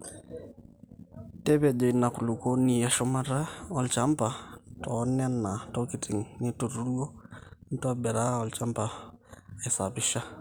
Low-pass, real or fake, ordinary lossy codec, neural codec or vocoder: none; real; none; none